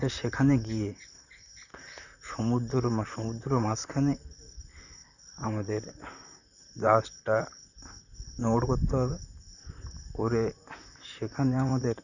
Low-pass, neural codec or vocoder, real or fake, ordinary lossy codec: 7.2 kHz; vocoder, 44.1 kHz, 128 mel bands, Pupu-Vocoder; fake; none